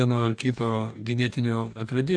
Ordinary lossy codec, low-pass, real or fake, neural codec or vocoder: AAC, 64 kbps; 9.9 kHz; fake; codec, 44.1 kHz, 2.6 kbps, DAC